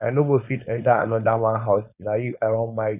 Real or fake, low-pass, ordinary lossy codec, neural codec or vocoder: fake; 3.6 kHz; none; codec, 16 kHz, 4.8 kbps, FACodec